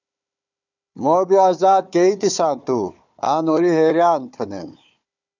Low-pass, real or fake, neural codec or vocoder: 7.2 kHz; fake; codec, 16 kHz, 4 kbps, FunCodec, trained on Chinese and English, 50 frames a second